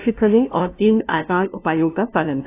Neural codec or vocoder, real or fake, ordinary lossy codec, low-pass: codec, 16 kHz, 0.5 kbps, FunCodec, trained on LibriTTS, 25 frames a second; fake; AAC, 24 kbps; 3.6 kHz